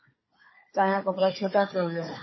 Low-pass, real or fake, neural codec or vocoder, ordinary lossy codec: 7.2 kHz; fake; codec, 16 kHz, 4 kbps, FunCodec, trained on Chinese and English, 50 frames a second; MP3, 24 kbps